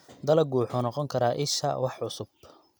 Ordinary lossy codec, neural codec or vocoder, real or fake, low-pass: none; none; real; none